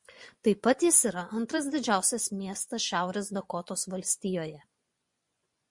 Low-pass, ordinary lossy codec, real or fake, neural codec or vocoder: 10.8 kHz; MP3, 64 kbps; fake; vocoder, 24 kHz, 100 mel bands, Vocos